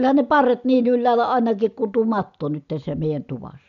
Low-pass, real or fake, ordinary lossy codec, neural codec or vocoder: 7.2 kHz; real; none; none